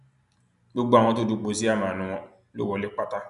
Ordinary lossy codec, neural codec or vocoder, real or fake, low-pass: none; none; real; 10.8 kHz